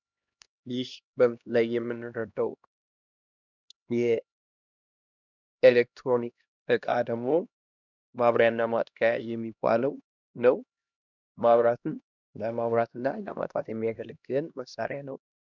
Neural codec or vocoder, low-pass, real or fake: codec, 16 kHz, 1 kbps, X-Codec, HuBERT features, trained on LibriSpeech; 7.2 kHz; fake